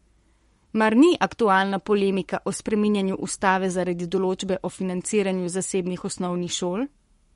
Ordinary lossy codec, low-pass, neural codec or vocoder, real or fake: MP3, 48 kbps; 19.8 kHz; codec, 44.1 kHz, 7.8 kbps, Pupu-Codec; fake